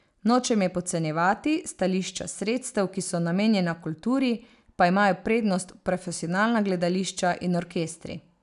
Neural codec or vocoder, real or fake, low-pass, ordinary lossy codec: none; real; 10.8 kHz; none